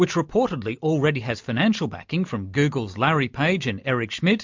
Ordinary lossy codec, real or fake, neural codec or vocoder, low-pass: MP3, 64 kbps; real; none; 7.2 kHz